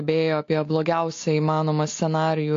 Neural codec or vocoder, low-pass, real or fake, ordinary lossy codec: none; 7.2 kHz; real; AAC, 48 kbps